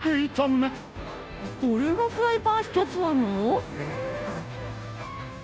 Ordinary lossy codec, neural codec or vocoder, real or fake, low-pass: none; codec, 16 kHz, 0.5 kbps, FunCodec, trained on Chinese and English, 25 frames a second; fake; none